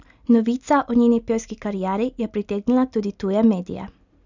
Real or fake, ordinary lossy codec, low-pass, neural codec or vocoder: real; none; 7.2 kHz; none